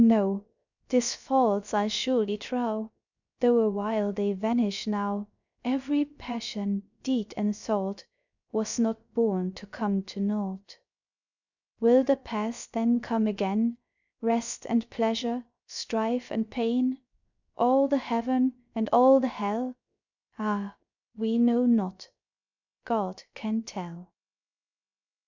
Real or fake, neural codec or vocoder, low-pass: fake; codec, 16 kHz, 0.3 kbps, FocalCodec; 7.2 kHz